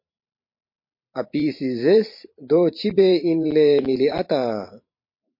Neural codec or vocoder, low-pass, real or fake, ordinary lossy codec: vocoder, 44.1 kHz, 128 mel bands every 512 samples, BigVGAN v2; 5.4 kHz; fake; MP3, 32 kbps